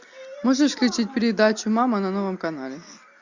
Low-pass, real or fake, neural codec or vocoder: 7.2 kHz; real; none